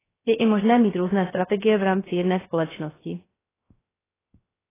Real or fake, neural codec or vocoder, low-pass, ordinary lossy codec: fake; codec, 16 kHz, 0.3 kbps, FocalCodec; 3.6 kHz; AAC, 16 kbps